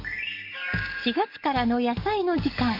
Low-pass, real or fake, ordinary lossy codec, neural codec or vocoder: 5.4 kHz; fake; MP3, 32 kbps; codec, 44.1 kHz, 7.8 kbps, DAC